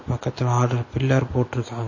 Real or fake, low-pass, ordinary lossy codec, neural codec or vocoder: real; 7.2 kHz; MP3, 32 kbps; none